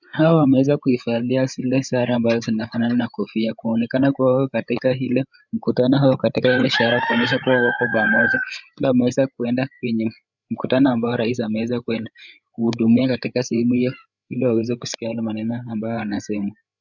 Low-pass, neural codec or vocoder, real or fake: 7.2 kHz; codec, 16 kHz, 8 kbps, FreqCodec, larger model; fake